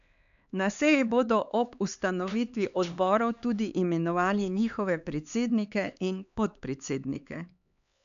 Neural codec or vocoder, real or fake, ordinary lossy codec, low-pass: codec, 16 kHz, 4 kbps, X-Codec, HuBERT features, trained on LibriSpeech; fake; none; 7.2 kHz